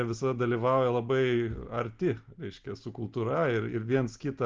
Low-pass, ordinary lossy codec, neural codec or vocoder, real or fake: 7.2 kHz; Opus, 24 kbps; none; real